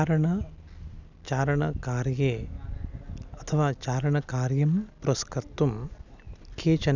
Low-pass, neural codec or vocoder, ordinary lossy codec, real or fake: 7.2 kHz; none; none; real